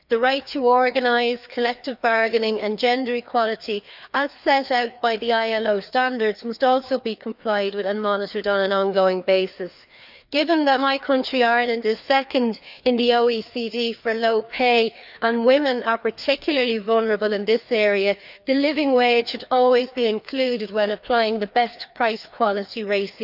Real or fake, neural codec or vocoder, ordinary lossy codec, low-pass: fake; codec, 16 kHz, 2 kbps, FreqCodec, larger model; none; 5.4 kHz